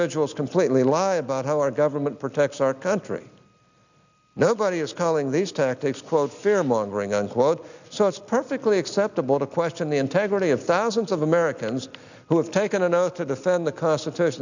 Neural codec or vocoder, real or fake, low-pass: none; real; 7.2 kHz